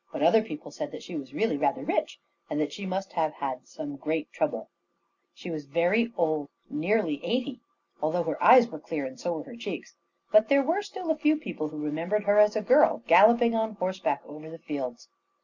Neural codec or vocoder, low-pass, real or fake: none; 7.2 kHz; real